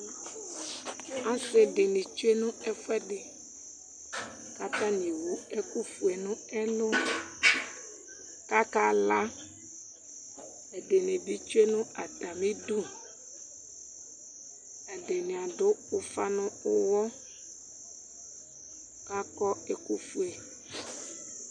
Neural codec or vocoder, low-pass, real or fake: none; 9.9 kHz; real